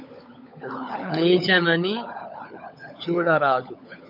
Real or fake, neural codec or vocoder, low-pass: fake; codec, 16 kHz, 16 kbps, FunCodec, trained on LibriTTS, 50 frames a second; 5.4 kHz